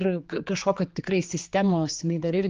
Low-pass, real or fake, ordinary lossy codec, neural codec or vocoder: 7.2 kHz; fake; Opus, 32 kbps; codec, 16 kHz, 4 kbps, X-Codec, HuBERT features, trained on general audio